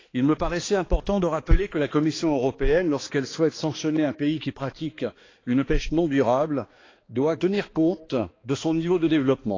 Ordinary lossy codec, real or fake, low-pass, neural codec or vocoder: AAC, 32 kbps; fake; 7.2 kHz; codec, 16 kHz, 2 kbps, X-Codec, HuBERT features, trained on balanced general audio